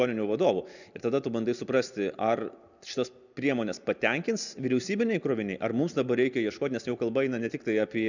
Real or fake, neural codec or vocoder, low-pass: real; none; 7.2 kHz